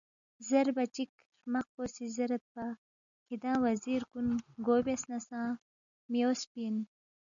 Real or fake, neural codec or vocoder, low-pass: real; none; 7.2 kHz